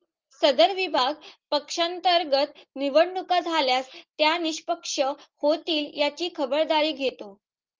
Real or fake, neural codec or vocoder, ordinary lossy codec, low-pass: real; none; Opus, 24 kbps; 7.2 kHz